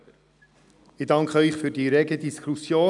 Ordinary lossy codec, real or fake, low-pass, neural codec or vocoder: none; real; 10.8 kHz; none